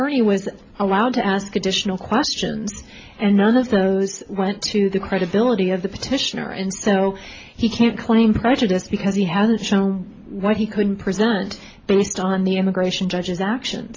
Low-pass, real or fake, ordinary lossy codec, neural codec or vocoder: 7.2 kHz; real; AAC, 32 kbps; none